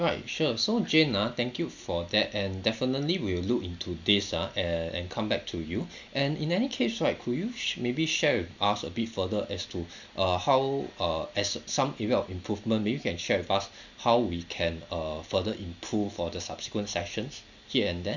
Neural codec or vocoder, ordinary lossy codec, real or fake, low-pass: none; none; real; 7.2 kHz